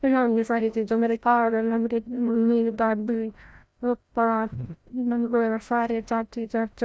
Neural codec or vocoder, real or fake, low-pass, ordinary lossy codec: codec, 16 kHz, 0.5 kbps, FreqCodec, larger model; fake; none; none